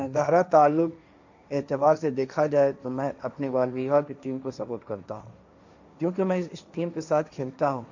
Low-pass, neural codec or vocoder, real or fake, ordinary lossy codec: 7.2 kHz; codec, 16 kHz, 1.1 kbps, Voila-Tokenizer; fake; none